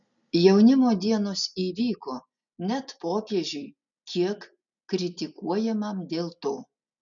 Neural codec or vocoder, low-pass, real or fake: none; 7.2 kHz; real